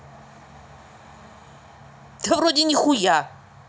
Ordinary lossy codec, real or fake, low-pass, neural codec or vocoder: none; real; none; none